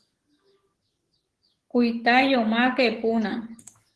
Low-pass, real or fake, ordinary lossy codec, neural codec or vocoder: 10.8 kHz; fake; Opus, 16 kbps; codec, 44.1 kHz, 7.8 kbps, DAC